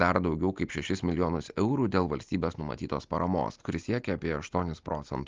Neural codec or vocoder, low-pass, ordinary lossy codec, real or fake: none; 7.2 kHz; Opus, 32 kbps; real